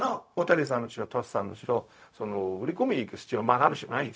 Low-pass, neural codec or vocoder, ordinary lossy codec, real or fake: none; codec, 16 kHz, 0.4 kbps, LongCat-Audio-Codec; none; fake